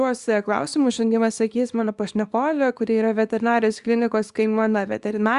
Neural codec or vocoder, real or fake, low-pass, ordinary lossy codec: codec, 24 kHz, 0.9 kbps, WavTokenizer, small release; fake; 10.8 kHz; Opus, 64 kbps